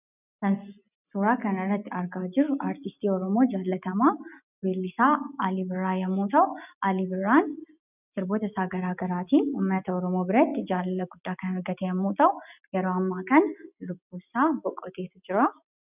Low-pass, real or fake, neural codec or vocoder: 3.6 kHz; real; none